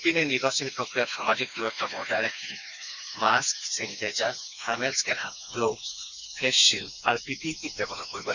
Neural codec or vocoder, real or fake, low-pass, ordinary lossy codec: codec, 16 kHz, 2 kbps, FreqCodec, smaller model; fake; 7.2 kHz; none